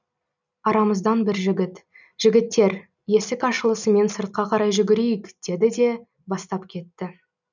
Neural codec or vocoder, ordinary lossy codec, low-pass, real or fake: none; none; 7.2 kHz; real